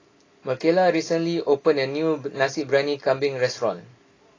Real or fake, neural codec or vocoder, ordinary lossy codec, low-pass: real; none; AAC, 32 kbps; 7.2 kHz